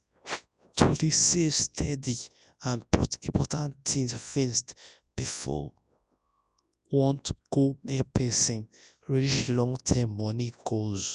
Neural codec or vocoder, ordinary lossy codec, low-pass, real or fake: codec, 24 kHz, 0.9 kbps, WavTokenizer, large speech release; none; 10.8 kHz; fake